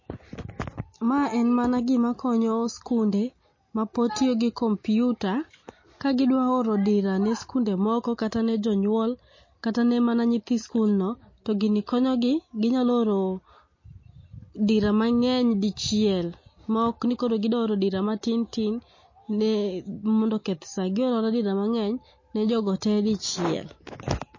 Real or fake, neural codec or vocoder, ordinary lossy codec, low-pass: real; none; MP3, 32 kbps; 7.2 kHz